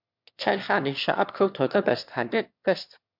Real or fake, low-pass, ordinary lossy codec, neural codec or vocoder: fake; 5.4 kHz; MP3, 48 kbps; autoencoder, 22.05 kHz, a latent of 192 numbers a frame, VITS, trained on one speaker